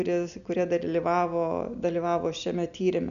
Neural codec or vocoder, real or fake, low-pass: none; real; 7.2 kHz